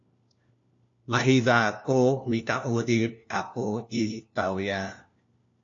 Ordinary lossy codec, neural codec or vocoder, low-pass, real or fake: AAC, 48 kbps; codec, 16 kHz, 1 kbps, FunCodec, trained on LibriTTS, 50 frames a second; 7.2 kHz; fake